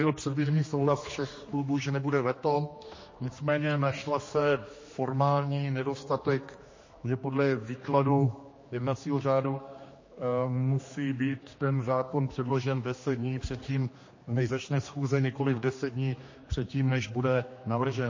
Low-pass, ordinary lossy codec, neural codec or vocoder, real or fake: 7.2 kHz; MP3, 32 kbps; codec, 16 kHz, 2 kbps, X-Codec, HuBERT features, trained on general audio; fake